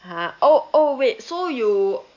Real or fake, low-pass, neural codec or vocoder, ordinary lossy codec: real; 7.2 kHz; none; none